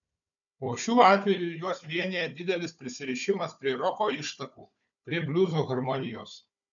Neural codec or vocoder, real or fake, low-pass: codec, 16 kHz, 4 kbps, FunCodec, trained on Chinese and English, 50 frames a second; fake; 7.2 kHz